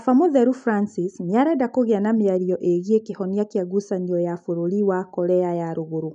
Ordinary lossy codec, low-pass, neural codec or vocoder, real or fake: none; 9.9 kHz; none; real